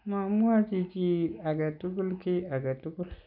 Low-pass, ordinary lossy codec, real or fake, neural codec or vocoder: 5.4 kHz; none; real; none